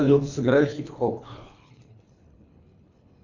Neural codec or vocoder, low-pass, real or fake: codec, 24 kHz, 3 kbps, HILCodec; 7.2 kHz; fake